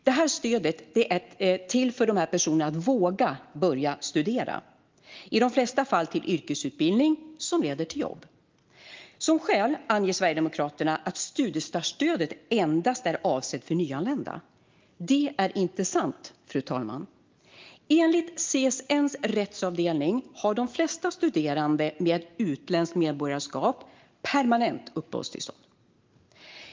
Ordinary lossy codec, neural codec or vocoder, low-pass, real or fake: Opus, 32 kbps; none; 7.2 kHz; real